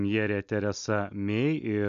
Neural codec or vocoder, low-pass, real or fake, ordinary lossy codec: none; 7.2 kHz; real; MP3, 96 kbps